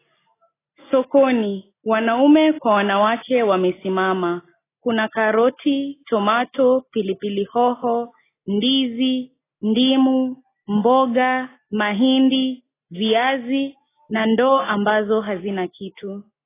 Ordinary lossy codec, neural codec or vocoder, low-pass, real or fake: AAC, 16 kbps; none; 3.6 kHz; real